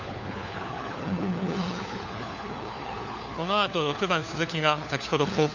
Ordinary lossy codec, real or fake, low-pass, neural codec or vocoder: none; fake; 7.2 kHz; codec, 16 kHz, 4 kbps, FunCodec, trained on LibriTTS, 50 frames a second